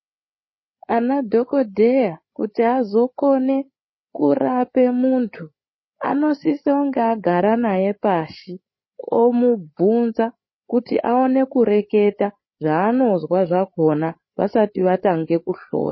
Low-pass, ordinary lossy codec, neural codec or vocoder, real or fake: 7.2 kHz; MP3, 24 kbps; codec, 16 kHz, 8 kbps, FreqCodec, larger model; fake